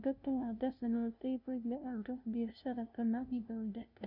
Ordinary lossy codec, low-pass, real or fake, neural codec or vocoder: none; 5.4 kHz; fake; codec, 16 kHz, 0.5 kbps, FunCodec, trained on LibriTTS, 25 frames a second